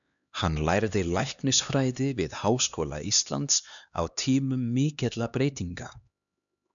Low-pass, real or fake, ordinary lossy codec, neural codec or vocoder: 7.2 kHz; fake; MP3, 96 kbps; codec, 16 kHz, 2 kbps, X-Codec, HuBERT features, trained on LibriSpeech